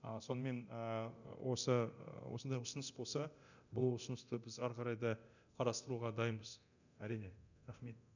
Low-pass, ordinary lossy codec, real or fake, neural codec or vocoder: 7.2 kHz; none; fake; codec, 24 kHz, 0.9 kbps, DualCodec